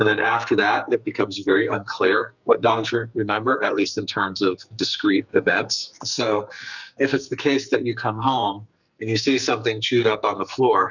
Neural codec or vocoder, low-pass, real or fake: codec, 44.1 kHz, 2.6 kbps, SNAC; 7.2 kHz; fake